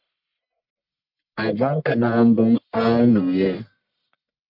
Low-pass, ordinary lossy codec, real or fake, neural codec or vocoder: 5.4 kHz; MP3, 48 kbps; fake; codec, 44.1 kHz, 1.7 kbps, Pupu-Codec